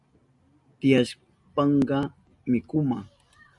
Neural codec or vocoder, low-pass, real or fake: none; 10.8 kHz; real